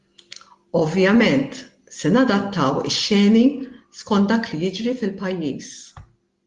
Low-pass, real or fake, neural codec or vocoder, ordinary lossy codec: 10.8 kHz; real; none; Opus, 24 kbps